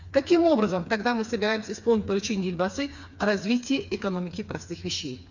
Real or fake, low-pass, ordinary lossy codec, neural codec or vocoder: fake; 7.2 kHz; none; codec, 16 kHz, 4 kbps, FreqCodec, smaller model